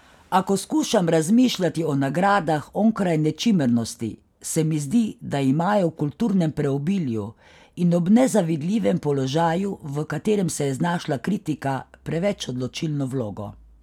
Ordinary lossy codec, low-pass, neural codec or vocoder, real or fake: none; 19.8 kHz; none; real